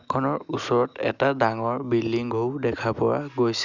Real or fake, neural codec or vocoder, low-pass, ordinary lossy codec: real; none; 7.2 kHz; Opus, 64 kbps